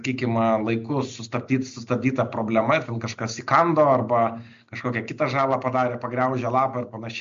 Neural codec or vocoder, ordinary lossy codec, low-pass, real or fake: none; MP3, 48 kbps; 7.2 kHz; real